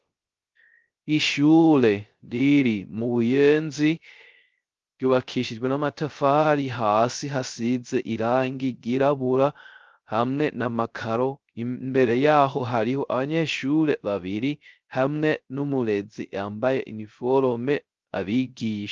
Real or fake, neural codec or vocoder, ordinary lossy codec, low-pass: fake; codec, 16 kHz, 0.3 kbps, FocalCodec; Opus, 32 kbps; 7.2 kHz